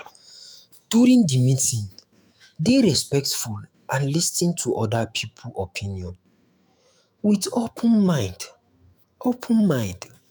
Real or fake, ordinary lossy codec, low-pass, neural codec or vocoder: fake; none; none; autoencoder, 48 kHz, 128 numbers a frame, DAC-VAE, trained on Japanese speech